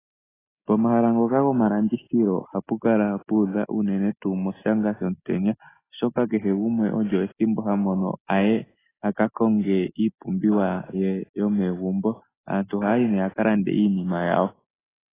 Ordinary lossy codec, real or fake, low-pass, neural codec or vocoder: AAC, 16 kbps; real; 3.6 kHz; none